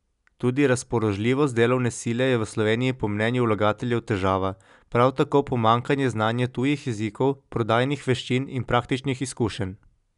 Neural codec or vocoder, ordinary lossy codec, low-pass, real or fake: none; none; 10.8 kHz; real